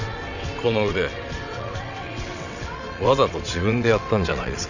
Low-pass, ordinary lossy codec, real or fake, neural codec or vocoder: 7.2 kHz; none; fake; vocoder, 22.05 kHz, 80 mel bands, WaveNeXt